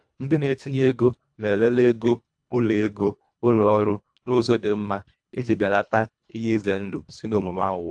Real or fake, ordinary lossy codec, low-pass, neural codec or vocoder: fake; AAC, 64 kbps; 9.9 kHz; codec, 24 kHz, 1.5 kbps, HILCodec